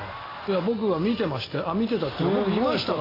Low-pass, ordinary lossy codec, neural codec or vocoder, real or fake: 5.4 kHz; AAC, 24 kbps; none; real